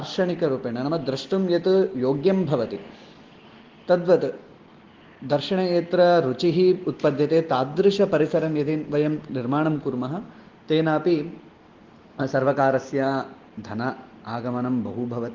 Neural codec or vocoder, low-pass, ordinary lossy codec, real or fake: none; 7.2 kHz; Opus, 16 kbps; real